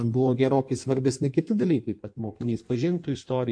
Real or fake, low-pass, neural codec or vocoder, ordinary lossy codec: fake; 9.9 kHz; codec, 16 kHz in and 24 kHz out, 1.1 kbps, FireRedTTS-2 codec; MP3, 64 kbps